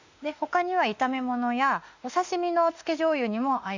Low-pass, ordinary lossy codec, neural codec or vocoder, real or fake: 7.2 kHz; Opus, 64 kbps; autoencoder, 48 kHz, 32 numbers a frame, DAC-VAE, trained on Japanese speech; fake